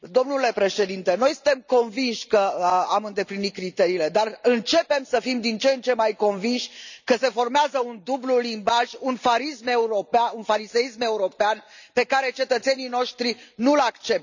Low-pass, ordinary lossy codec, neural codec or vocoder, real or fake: 7.2 kHz; none; none; real